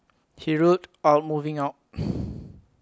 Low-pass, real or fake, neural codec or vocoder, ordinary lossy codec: none; real; none; none